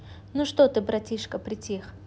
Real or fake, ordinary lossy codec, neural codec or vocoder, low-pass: real; none; none; none